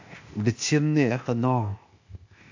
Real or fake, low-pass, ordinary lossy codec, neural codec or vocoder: fake; 7.2 kHz; AAC, 48 kbps; codec, 16 kHz, 0.8 kbps, ZipCodec